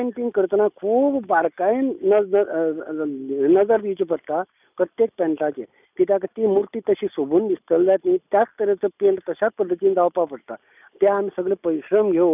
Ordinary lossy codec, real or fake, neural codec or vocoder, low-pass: none; real; none; 3.6 kHz